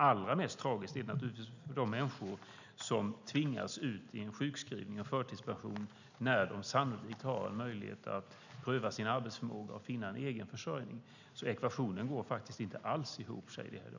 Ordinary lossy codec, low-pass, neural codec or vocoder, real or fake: none; 7.2 kHz; none; real